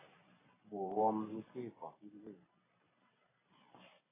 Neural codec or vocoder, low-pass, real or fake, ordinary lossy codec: vocoder, 44.1 kHz, 128 mel bands every 512 samples, BigVGAN v2; 3.6 kHz; fake; AAC, 16 kbps